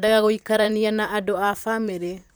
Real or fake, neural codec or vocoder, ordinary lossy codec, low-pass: fake; vocoder, 44.1 kHz, 128 mel bands, Pupu-Vocoder; none; none